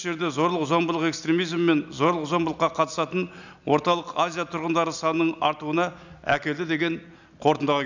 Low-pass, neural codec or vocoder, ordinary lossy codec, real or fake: 7.2 kHz; none; none; real